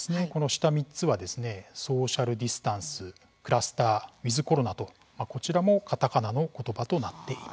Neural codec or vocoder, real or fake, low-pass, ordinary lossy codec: none; real; none; none